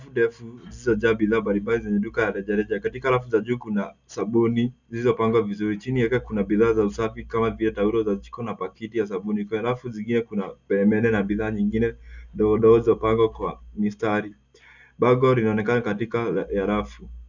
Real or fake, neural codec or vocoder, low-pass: real; none; 7.2 kHz